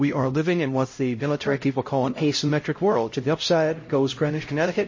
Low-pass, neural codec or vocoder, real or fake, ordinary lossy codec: 7.2 kHz; codec, 16 kHz, 0.5 kbps, X-Codec, HuBERT features, trained on LibriSpeech; fake; MP3, 32 kbps